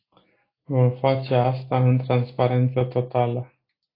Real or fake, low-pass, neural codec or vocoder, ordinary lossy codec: real; 5.4 kHz; none; AAC, 24 kbps